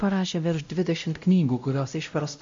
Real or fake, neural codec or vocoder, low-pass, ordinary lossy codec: fake; codec, 16 kHz, 0.5 kbps, X-Codec, WavLM features, trained on Multilingual LibriSpeech; 7.2 kHz; MP3, 48 kbps